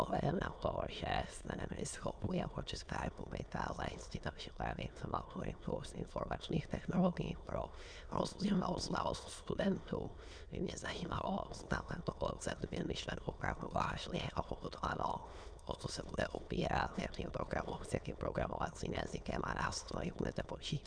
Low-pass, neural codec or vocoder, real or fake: 9.9 kHz; autoencoder, 22.05 kHz, a latent of 192 numbers a frame, VITS, trained on many speakers; fake